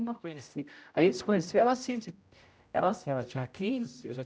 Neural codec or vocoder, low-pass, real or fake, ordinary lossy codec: codec, 16 kHz, 0.5 kbps, X-Codec, HuBERT features, trained on general audio; none; fake; none